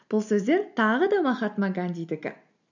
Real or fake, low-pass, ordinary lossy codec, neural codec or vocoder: real; 7.2 kHz; none; none